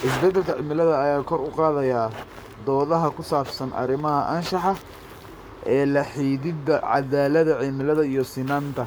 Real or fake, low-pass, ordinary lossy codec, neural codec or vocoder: fake; none; none; codec, 44.1 kHz, 7.8 kbps, Pupu-Codec